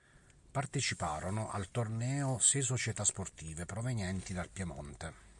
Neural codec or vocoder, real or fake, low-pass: none; real; 10.8 kHz